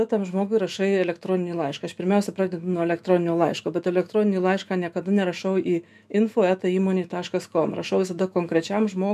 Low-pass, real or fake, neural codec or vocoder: 14.4 kHz; fake; autoencoder, 48 kHz, 128 numbers a frame, DAC-VAE, trained on Japanese speech